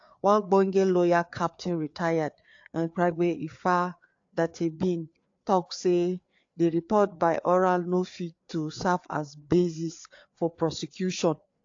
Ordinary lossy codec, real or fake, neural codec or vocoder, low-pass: AAC, 48 kbps; fake; codec, 16 kHz, 4 kbps, FreqCodec, larger model; 7.2 kHz